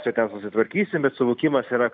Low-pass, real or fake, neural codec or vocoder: 7.2 kHz; real; none